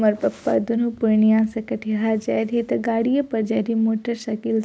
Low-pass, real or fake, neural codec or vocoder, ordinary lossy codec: none; real; none; none